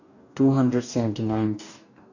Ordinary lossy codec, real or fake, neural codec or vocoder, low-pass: none; fake; codec, 44.1 kHz, 2.6 kbps, DAC; 7.2 kHz